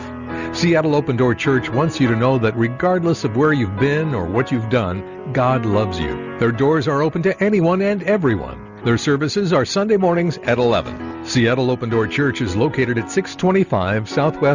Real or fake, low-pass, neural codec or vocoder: real; 7.2 kHz; none